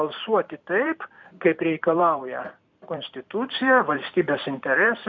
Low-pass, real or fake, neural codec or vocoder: 7.2 kHz; fake; vocoder, 44.1 kHz, 128 mel bands every 256 samples, BigVGAN v2